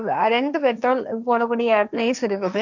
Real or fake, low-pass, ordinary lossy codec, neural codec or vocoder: fake; 7.2 kHz; none; codec, 16 kHz, 1.1 kbps, Voila-Tokenizer